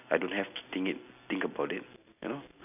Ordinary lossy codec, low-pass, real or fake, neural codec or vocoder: none; 3.6 kHz; real; none